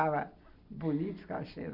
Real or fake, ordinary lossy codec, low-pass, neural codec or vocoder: real; none; 5.4 kHz; none